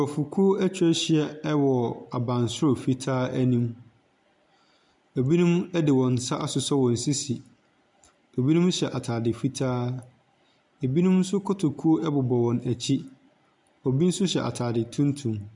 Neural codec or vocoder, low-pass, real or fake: none; 10.8 kHz; real